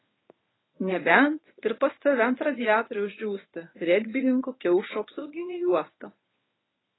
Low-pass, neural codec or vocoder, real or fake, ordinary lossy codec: 7.2 kHz; codec, 24 kHz, 0.9 kbps, WavTokenizer, medium speech release version 1; fake; AAC, 16 kbps